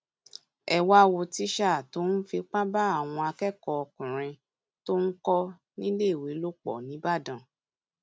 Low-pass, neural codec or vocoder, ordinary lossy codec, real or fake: none; none; none; real